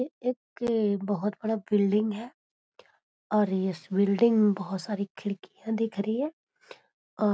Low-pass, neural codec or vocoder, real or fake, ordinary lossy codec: none; none; real; none